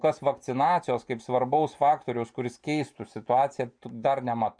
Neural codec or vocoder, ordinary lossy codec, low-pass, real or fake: none; MP3, 64 kbps; 9.9 kHz; real